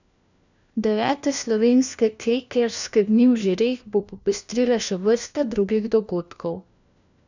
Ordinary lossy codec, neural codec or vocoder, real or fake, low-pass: none; codec, 16 kHz, 1 kbps, FunCodec, trained on LibriTTS, 50 frames a second; fake; 7.2 kHz